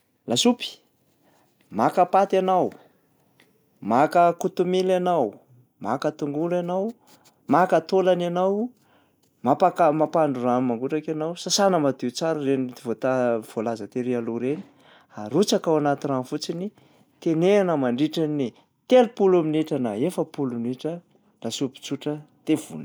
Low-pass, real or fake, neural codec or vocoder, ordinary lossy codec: none; real; none; none